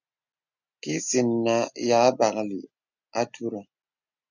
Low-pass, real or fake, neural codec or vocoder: 7.2 kHz; real; none